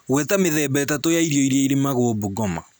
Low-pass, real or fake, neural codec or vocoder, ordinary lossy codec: none; real; none; none